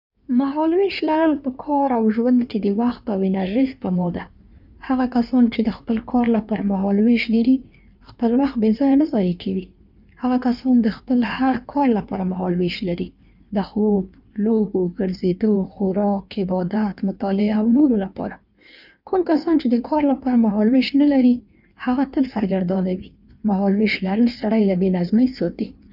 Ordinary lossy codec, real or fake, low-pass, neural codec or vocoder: none; fake; 5.4 kHz; codec, 16 kHz in and 24 kHz out, 1.1 kbps, FireRedTTS-2 codec